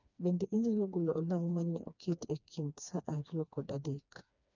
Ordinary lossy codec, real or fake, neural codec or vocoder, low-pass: none; fake; codec, 16 kHz, 2 kbps, FreqCodec, smaller model; 7.2 kHz